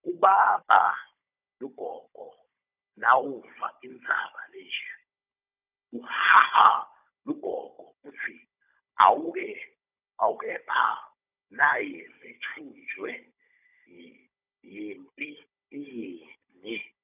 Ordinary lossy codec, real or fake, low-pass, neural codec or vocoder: none; fake; 3.6 kHz; codec, 16 kHz, 16 kbps, FunCodec, trained on Chinese and English, 50 frames a second